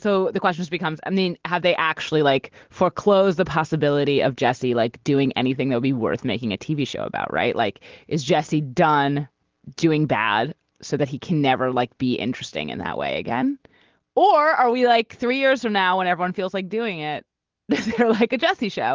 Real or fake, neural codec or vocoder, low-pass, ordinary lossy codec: real; none; 7.2 kHz; Opus, 16 kbps